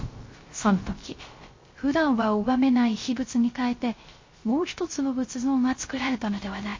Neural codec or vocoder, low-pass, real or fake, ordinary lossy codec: codec, 16 kHz, 0.3 kbps, FocalCodec; 7.2 kHz; fake; MP3, 32 kbps